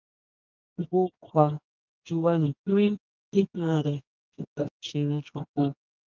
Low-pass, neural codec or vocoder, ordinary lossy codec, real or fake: 7.2 kHz; codec, 24 kHz, 0.9 kbps, WavTokenizer, medium music audio release; Opus, 24 kbps; fake